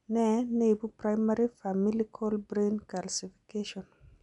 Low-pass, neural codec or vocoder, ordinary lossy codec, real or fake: 10.8 kHz; none; none; real